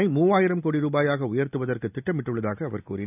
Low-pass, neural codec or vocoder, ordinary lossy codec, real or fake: 3.6 kHz; none; none; real